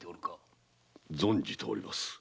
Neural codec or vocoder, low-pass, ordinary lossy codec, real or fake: none; none; none; real